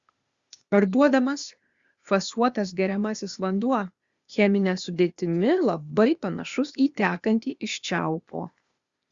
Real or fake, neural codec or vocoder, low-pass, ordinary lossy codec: fake; codec, 16 kHz, 0.8 kbps, ZipCodec; 7.2 kHz; Opus, 64 kbps